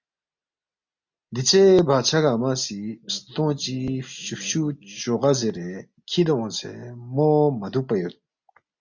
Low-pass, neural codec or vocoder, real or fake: 7.2 kHz; none; real